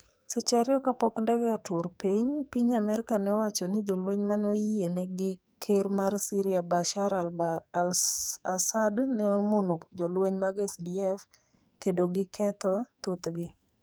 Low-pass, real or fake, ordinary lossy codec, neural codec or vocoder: none; fake; none; codec, 44.1 kHz, 2.6 kbps, SNAC